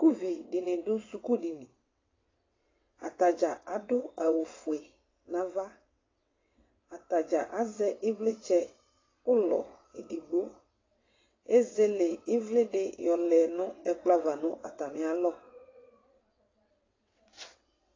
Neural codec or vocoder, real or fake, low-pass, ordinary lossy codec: vocoder, 22.05 kHz, 80 mel bands, WaveNeXt; fake; 7.2 kHz; AAC, 32 kbps